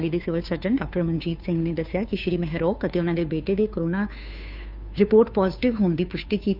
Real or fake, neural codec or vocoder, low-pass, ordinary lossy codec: fake; codec, 16 kHz, 2 kbps, FunCodec, trained on Chinese and English, 25 frames a second; 5.4 kHz; none